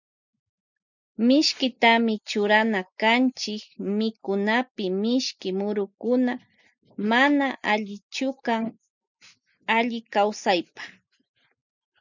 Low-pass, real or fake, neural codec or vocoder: 7.2 kHz; real; none